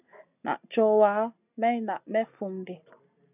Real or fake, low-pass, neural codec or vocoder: real; 3.6 kHz; none